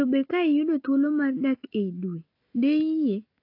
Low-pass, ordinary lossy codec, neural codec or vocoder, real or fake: 5.4 kHz; AAC, 32 kbps; none; real